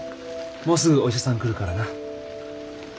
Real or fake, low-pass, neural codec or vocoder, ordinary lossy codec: real; none; none; none